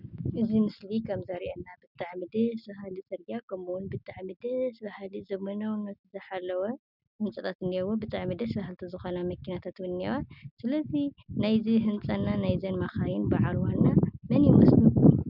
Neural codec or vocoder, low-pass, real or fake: none; 5.4 kHz; real